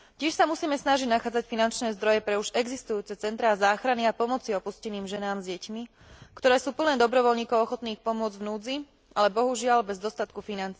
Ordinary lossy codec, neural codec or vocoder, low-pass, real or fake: none; none; none; real